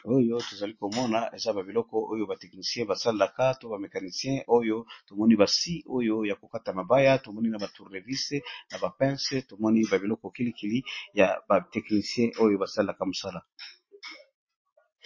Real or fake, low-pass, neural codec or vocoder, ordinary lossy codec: real; 7.2 kHz; none; MP3, 32 kbps